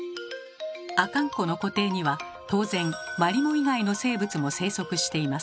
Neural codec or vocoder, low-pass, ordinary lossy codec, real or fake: none; none; none; real